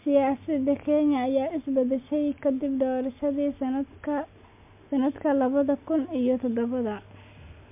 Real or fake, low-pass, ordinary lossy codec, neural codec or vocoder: real; 3.6 kHz; MP3, 32 kbps; none